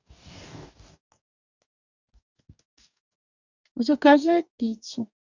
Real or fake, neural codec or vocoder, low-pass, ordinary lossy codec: fake; codec, 44.1 kHz, 2.6 kbps, DAC; 7.2 kHz; none